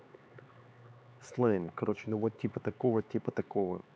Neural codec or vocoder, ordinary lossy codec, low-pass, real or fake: codec, 16 kHz, 4 kbps, X-Codec, HuBERT features, trained on LibriSpeech; none; none; fake